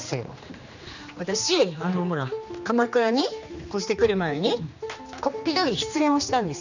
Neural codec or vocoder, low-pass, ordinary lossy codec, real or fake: codec, 16 kHz, 2 kbps, X-Codec, HuBERT features, trained on general audio; 7.2 kHz; none; fake